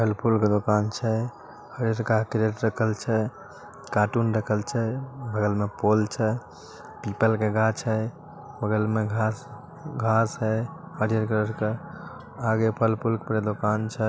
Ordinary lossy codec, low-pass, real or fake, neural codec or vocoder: none; none; real; none